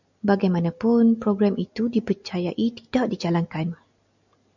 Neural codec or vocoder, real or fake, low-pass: none; real; 7.2 kHz